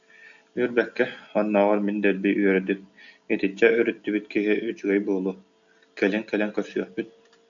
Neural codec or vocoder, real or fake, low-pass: none; real; 7.2 kHz